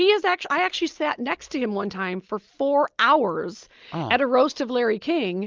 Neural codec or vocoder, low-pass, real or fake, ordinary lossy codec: none; 7.2 kHz; real; Opus, 24 kbps